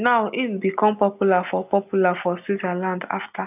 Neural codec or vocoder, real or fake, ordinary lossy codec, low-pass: none; real; none; 3.6 kHz